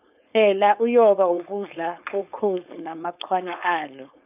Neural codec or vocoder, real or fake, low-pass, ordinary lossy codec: codec, 16 kHz, 4.8 kbps, FACodec; fake; 3.6 kHz; none